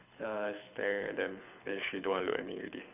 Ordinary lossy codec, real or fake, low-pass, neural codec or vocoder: none; fake; 3.6 kHz; codec, 24 kHz, 6 kbps, HILCodec